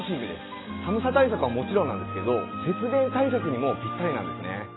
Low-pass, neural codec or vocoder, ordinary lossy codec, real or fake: 7.2 kHz; none; AAC, 16 kbps; real